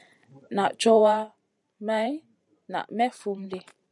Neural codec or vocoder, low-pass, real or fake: vocoder, 44.1 kHz, 128 mel bands every 512 samples, BigVGAN v2; 10.8 kHz; fake